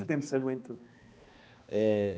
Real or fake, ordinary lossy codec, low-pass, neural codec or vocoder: fake; none; none; codec, 16 kHz, 2 kbps, X-Codec, HuBERT features, trained on balanced general audio